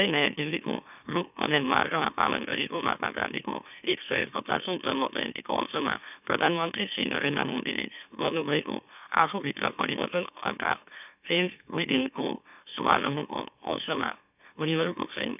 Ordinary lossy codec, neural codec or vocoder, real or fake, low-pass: none; autoencoder, 44.1 kHz, a latent of 192 numbers a frame, MeloTTS; fake; 3.6 kHz